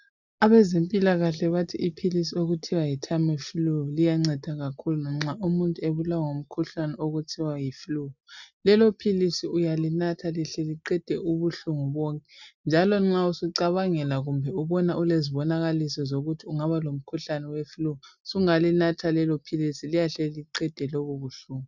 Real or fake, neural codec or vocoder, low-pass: real; none; 7.2 kHz